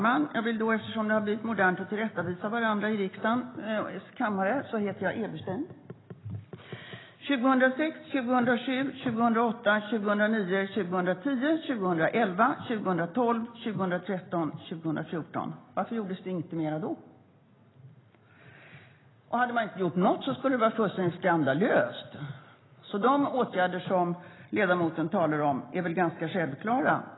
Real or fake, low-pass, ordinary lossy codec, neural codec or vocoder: real; 7.2 kHz; AAC, 16 kbps; none